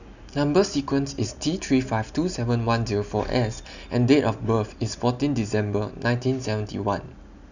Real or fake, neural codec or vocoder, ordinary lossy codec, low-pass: real; none; none; 7.2 kHz